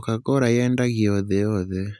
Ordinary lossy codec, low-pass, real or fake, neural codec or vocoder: none; none; real; none